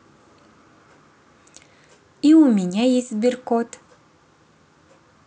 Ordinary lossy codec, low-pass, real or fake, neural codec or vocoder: none; none; real; none